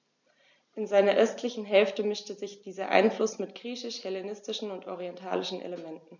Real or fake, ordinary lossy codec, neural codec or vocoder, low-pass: real; none; none; 7.2 kHz